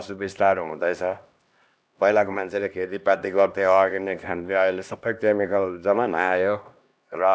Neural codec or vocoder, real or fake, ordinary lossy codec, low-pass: codec, 16 kHz, 1 kbps, X-Codec, HuBERT features, trained on LibriSpeech; fake; none; none